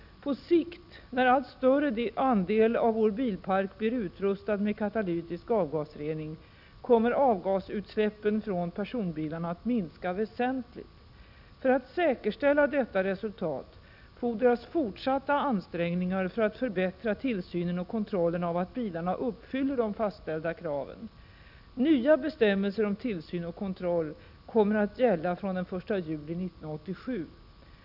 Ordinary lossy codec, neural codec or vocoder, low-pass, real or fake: none; none; 5.4 kHz; real